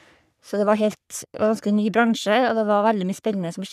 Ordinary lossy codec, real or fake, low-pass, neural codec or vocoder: none; fake; 14.4 kHz; codec, 44.1 kHz, 3.4 kbps, Pupu-Codec